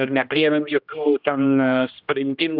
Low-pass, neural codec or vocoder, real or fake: 5.4 kHz; codec, 16 kHz, 1 kbps, X-Codec, HuBERT features, trained on general audio; fake